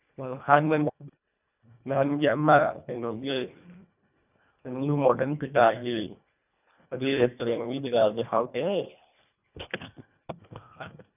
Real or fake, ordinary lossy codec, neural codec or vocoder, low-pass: fake; none; codec, 24 kHz, 1.5 kbps, HILCodec; 3.6 kHz